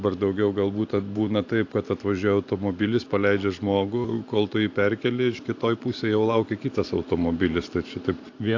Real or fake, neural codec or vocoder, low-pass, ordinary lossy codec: fake; vocoder, 44.1 kHz, 128 mel bands every 512 samples, BigVGAN v2; 7.2 kHz; AAC, 48 kbps